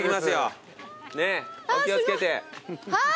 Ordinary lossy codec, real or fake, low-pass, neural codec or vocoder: none; real; none; none